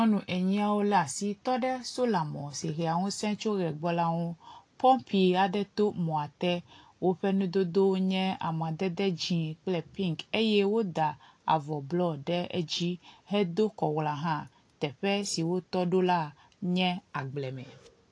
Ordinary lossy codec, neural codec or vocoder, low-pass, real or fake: AAC, 48 kbps; none; 9.9 kHz; real